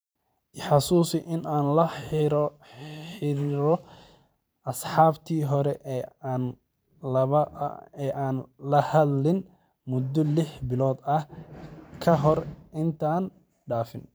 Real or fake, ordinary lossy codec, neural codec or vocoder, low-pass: fake; none; vocoder, 44.1 kHz, 128 mel bands every 512 samples, BigVGAN v2; none